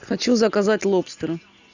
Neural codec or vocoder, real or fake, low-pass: none; real; 7.2 kHz